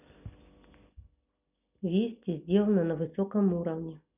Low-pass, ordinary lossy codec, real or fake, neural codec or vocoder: 3.6 kHz; none; real; none